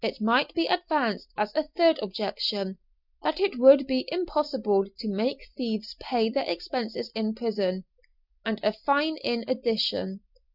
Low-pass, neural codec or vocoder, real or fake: 5.4 kHz; none; real